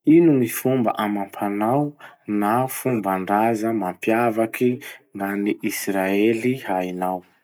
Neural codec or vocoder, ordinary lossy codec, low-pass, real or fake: none; none; none; real